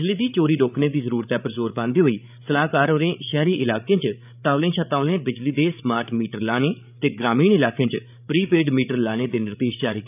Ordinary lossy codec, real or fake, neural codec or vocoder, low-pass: none; fake; codec, 16 kHz, 8 kbps, FreqCodec, larger model; 3.6 kHz